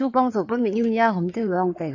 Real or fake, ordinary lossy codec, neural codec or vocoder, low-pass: fake; AAC, 48 kbps; codec, 16 kHz in and 24 kHz out, 2.2 kbps, FireRedTTS-2 codec; 7.2 kHz